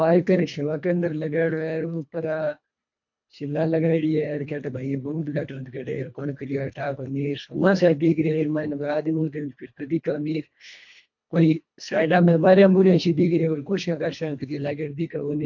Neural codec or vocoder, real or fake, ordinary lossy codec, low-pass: codec, 24 kHz, 1.5 kbps, HILCodec; fake; MP3, 48 kbps; 7.2 kHz